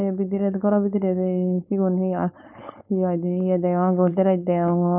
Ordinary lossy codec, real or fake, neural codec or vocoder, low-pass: none; fake; codec, 16 kHz, 4.8 kbps, FACodec; 3.6 kHz